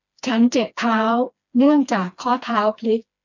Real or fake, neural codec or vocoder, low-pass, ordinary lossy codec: fake; codec, 16 kHz, 2 kbps, FreqCodec, smaller model; 7.2 kHz; none